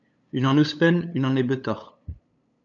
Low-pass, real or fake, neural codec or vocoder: 7.2 kHz; fake; codec, 16 kHz, 8 kbps, FunCodec, trained on LibriTTS, 25 frames a second